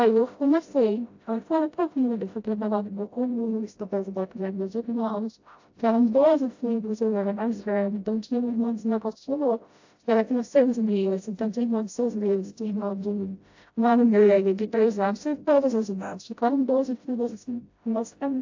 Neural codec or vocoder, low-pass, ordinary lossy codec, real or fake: codec, 16 kHz, 0.5 kbps, FreqCodec, smaller model; 7.2 kHz; AAC, 48 kbps; fake